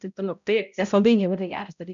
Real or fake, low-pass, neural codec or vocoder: fake; 7.2 kHz; codec, 16 kHz, 0.5 kbps, X-Codec, HuBERT features, trained on balanced general audio